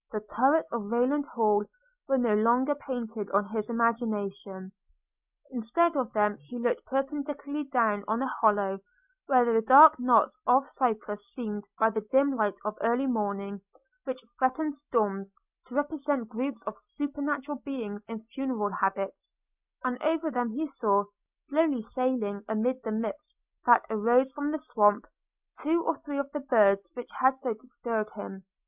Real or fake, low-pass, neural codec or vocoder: real; 3.6 kHz; none